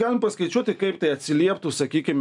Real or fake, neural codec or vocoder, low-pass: real; none; 10.8 kHz